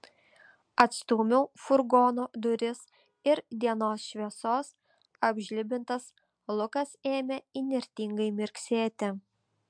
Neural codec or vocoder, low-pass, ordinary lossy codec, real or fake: none; 9.9 kHz; MP3, 64 kbps; real